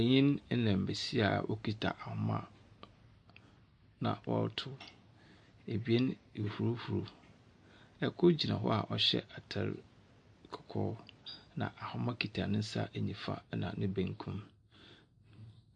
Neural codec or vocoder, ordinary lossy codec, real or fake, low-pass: none; MP3, 48 kbps; real; 9.9 kHz